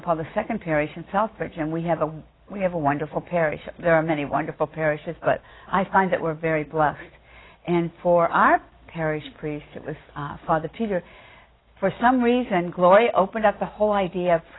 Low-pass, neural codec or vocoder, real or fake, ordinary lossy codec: 7.2 kHz; none; real; AAC, 16 kbps